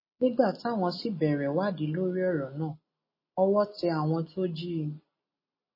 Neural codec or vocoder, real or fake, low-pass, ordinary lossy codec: none; real; 5.4 kHz; MP3, 24 kbps